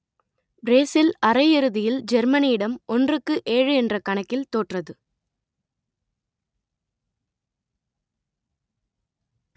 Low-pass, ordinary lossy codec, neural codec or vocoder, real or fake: none; none; none; real